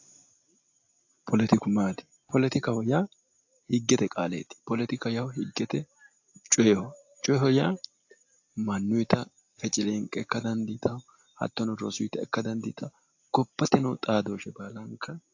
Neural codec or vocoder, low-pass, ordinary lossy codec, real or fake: none; 7.2 kHz; AAC, 48 kbps; real